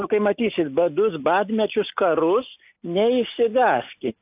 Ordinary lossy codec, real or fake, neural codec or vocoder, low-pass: AAC, 32 kbps; real; none; 3.6 kHz